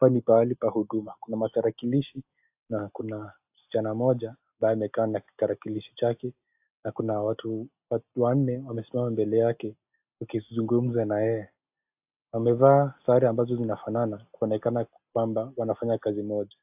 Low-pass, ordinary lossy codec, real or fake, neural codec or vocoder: 3.6 kHz; AAC, 32 kbps; real; none